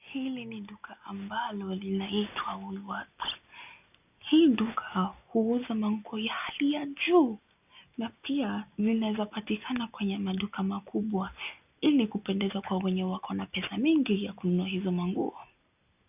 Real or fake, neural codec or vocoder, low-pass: real; none; 3.6 kHz